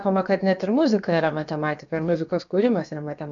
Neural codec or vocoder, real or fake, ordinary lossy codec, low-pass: codec, 16 kHz, about 1 kbps, DyCAST, with the encoder's durations; fake; AAC, 64 kbps; 7.2 kHz